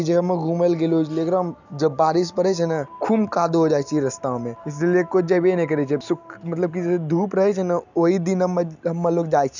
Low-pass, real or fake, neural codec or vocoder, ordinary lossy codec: 7.2 kHz; real; none; none